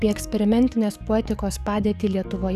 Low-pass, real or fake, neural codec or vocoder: 14.4 kHz; fake; codec, 44.1 kHz, 7.8 kbps, DAC